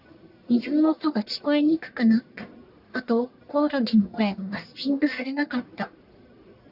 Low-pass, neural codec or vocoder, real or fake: 5.4 kHz; codec, 44.1 kHz, 1.7 kbps, Pupu-Codec; fake